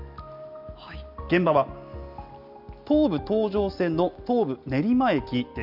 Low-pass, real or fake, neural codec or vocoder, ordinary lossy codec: 5.4 kHz; real; none; none